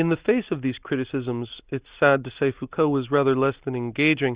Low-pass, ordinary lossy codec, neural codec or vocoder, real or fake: 3.6 kHz; Opus, 64 kbps; none; real